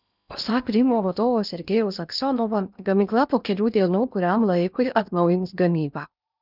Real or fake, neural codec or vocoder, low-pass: fake; codec, 16 kHz in and 24 kHz out, 0.8 kbps, FocalCodec, streaming, 65536 codes; 5.4 kHz